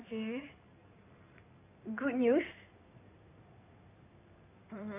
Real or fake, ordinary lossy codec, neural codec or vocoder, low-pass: fake; none; codec, 16 kHz in and 24 kHz out, 2.2 kbps, FireRedTTS-2 codec; 3.6 kHz